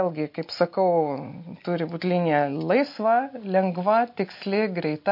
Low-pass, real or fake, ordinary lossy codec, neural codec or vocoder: 5.4 kHz; real; MP3, 32 kbps; none